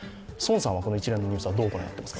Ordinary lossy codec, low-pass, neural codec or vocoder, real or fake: none; none; none; real